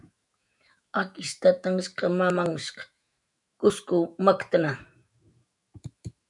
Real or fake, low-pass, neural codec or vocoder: fake; 10.8 kHz; autoencoder, 48 kHz, 128 numbers a frame, DAC-VAE, trained on Japanese speech